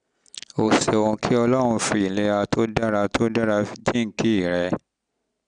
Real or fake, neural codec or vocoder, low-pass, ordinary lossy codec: real; none; 9.9 kHz; none